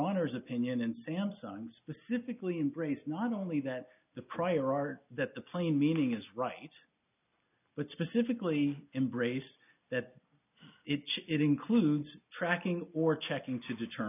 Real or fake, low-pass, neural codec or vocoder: real; 3.6 kHz; none